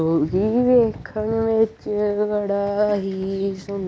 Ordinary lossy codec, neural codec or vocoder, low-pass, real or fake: none; none; none; real